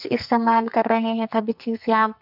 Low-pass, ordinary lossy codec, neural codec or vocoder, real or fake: 5.4 kHz; none; codec, 44.1 kHz, 2.6 kbps, SNAC; fake